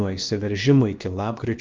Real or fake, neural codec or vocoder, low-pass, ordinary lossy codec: fake; codec, 16 kHz, about 1 kbps, DyCAST, with the encoder's durations; 7.2 kHz; Opus, 24 kbps